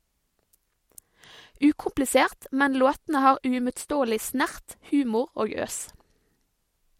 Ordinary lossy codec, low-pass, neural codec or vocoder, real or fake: MP3, 64 kbps; 19.8 kHz; none; real